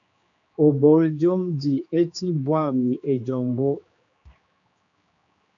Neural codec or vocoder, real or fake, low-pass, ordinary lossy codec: codec, 16 kHz, 2 kbps, X-Codec, HuBERT features, trained on general audio; fake; 7.2 kHz; AAC, 64 kbps